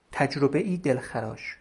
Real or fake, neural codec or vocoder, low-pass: real; none; 10.8 kHz